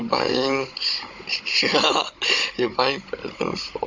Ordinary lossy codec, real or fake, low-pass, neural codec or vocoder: MP3, 48 kbps; fake; 7.2 kHz; codec, 16 kHz, 16 kbps, FunCodec, trained on Chinese and English, 50 frames a second